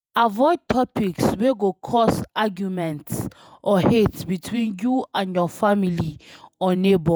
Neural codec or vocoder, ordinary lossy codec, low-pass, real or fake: vocoder, 48 kHz, 128 mel bands, Vocos; none; none; fake